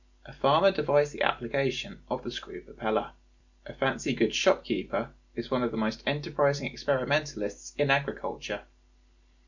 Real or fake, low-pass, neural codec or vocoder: real; 7.2 kHz; none